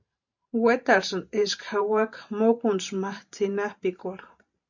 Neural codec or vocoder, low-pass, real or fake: vocoder, 44.1 kHz, 128 mel bands every 512 samples, BigVGAN v2; 7.2 kHz; fake